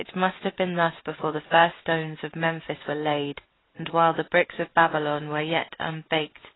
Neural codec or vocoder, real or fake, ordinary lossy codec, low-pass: none; real; AAC, 16 kbps; 7.2 kHz